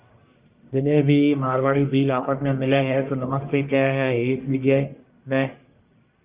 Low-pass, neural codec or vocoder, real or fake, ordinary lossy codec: 3.6 kHz; codec, 44.1 kHz, 1.7 kbps, Pupu-Codec; fake; Opus, 24 kbps